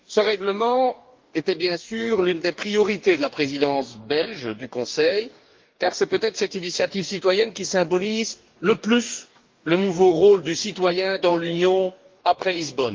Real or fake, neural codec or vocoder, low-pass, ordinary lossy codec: fake; codec, 44.1 kHz, 2.6 kbps, DAC; 7.2 kHz; Opus, 32 kbps